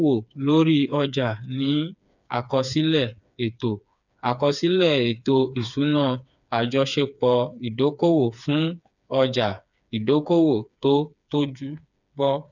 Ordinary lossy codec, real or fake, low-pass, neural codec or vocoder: none; fake; 7.2 kHz; codec, 16 kHz, 4 kbps, FreqCodec, smaller model